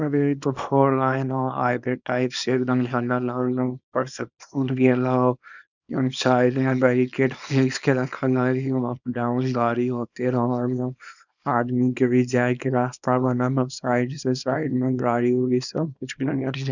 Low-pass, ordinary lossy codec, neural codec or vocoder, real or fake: 7.2 kHz; none; codec, 24 kHz, 0.9 kbps, WavTokenizer, small release; fake